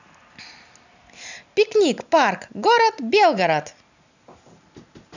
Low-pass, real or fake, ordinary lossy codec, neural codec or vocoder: 7.2 kHz; real; none; none